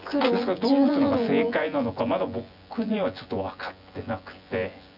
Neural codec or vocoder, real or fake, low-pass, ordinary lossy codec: vocoder, 24 kHz, 100 mel bands, Vocos; fake; 5.4 kHz; none